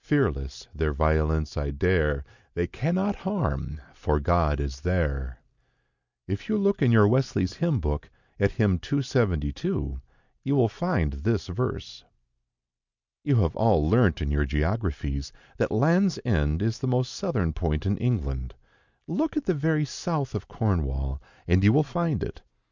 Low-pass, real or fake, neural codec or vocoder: 7.2 kHz; real; none